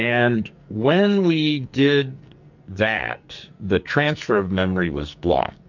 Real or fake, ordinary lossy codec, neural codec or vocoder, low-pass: fake; MP3, 48 kbps; codec, 44.1 kHz, 2.6 kbps, SNAC; 7.2 kHz